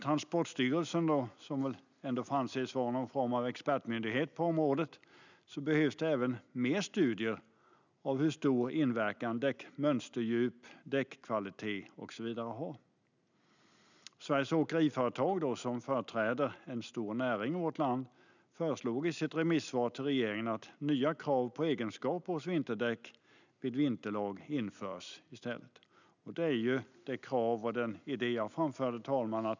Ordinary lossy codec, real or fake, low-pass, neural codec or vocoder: none; real; 7.2 kHz; none